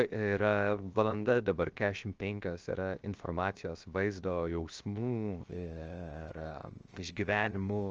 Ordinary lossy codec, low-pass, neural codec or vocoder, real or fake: Opus, 24 kbps; 7.2 kHz; codec, 16 kHz, 0.7 kbps, FocalCodec; fake